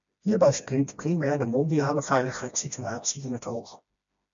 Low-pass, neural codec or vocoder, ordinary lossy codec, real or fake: 7.2 kHz; codec, 16 kHz, 1 kbps, FreqCodec, smaller model; MP3, 64 kbps; fake